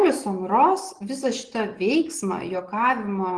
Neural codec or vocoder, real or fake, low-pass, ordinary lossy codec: none; real; 9.9 kHz; Opus, 16 kbps